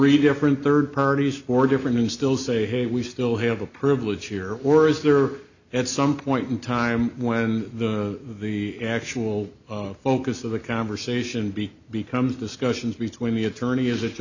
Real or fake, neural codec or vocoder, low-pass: real; none; 7.2 kHz